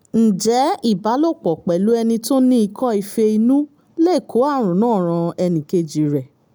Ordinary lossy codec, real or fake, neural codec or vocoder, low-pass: none; real; none; none